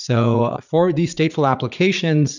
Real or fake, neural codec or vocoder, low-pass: fake; vocoder, 44.1 kHz, 80 mel bands, Vocos; 7.2 kHz